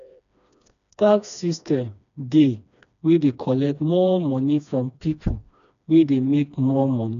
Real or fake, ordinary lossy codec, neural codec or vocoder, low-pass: fake; none; codec, 16 kHz, 2 kbps, FreqCodec, smaller model; 7.2 kHz